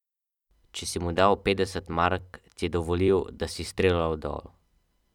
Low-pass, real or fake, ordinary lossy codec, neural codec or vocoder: 19.8 kHz; fake; none; vocoder, 44.1 kHz, 128 mel bands every 256 samples, BigVGAN v2